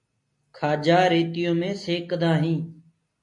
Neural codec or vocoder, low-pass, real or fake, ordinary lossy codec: none; 10.8 kHz; real; MP3, 48 kbps